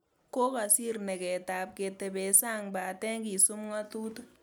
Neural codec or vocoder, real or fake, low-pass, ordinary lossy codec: none; real; none; none